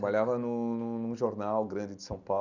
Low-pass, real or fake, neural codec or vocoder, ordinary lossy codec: 7.2 kHz; real; none; none